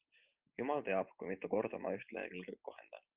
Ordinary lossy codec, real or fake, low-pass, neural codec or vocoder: Opus, 32 kbps; real; 3.6 kHz; none